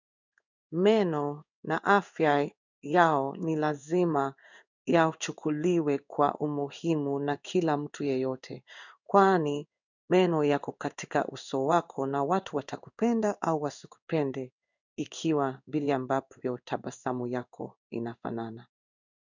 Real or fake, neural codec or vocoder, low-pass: fake; codec, 16 kHz in and 24 kHz out, 1 kbps, XY-Tokenizer; 7.2 kHz